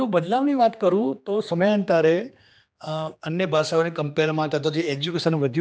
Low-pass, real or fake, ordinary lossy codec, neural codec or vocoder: none; fake; none; codec, 16 kHz, 2 kbps, X-Codec, HuBERT features, trained on general audio